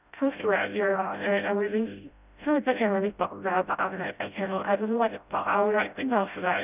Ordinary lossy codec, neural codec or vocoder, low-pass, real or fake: none; codec, 16 kHz, 0.5 kbps, FreqCodec, smaller model; 3.6 kHz; fake